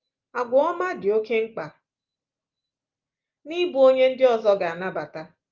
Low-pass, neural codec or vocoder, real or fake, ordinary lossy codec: 7.2 kHz; none; real; Opus, 24 kbps